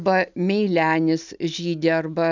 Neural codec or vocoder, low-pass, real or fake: none; 7.2 kHz; real